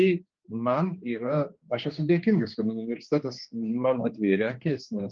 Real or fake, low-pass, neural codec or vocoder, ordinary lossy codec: fake; 7.2 kHz; codec, 16 kHz, 2 kbps, X-Codec, HuBERT features, trained on general audio; Opus, 24 kbps